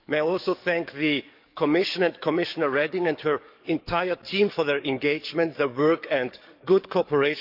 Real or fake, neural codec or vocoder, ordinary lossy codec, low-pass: fake; codec, 44.1 kHz, 7.8 kbps, DAC; none; 5.4 kHz